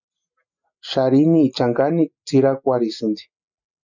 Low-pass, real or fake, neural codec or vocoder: 7.2 kHz; real; none